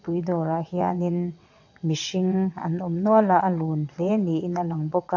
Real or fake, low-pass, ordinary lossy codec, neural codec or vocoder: fake; 7.2 kHz; AAC, 48 kbps; vocoder, 22.05 kHz, 80 mel bands, WaveNeXt